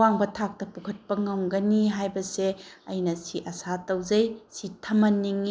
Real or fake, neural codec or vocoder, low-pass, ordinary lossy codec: real; none; none; none